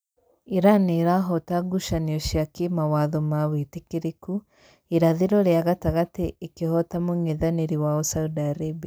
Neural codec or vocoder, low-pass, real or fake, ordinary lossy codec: none; none; real; none